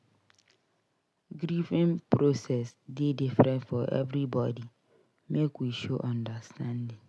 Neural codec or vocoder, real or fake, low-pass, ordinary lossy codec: none; real; none; none